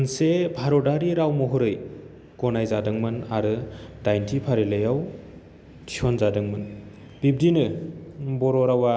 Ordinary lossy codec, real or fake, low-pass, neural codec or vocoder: none; real; none; none